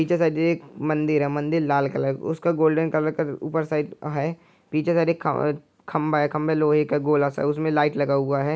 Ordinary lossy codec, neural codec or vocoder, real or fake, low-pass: none; none; real; none